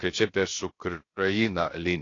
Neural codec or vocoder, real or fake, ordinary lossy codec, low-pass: codec, 16 kHz, 0.7 kbps, FocalCodec; fake; AAC, 32 kbps; 7.2 kHz